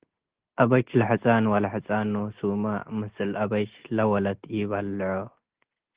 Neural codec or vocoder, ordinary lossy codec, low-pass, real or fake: none; Opus, 16 kbps; 3.6 kHz; real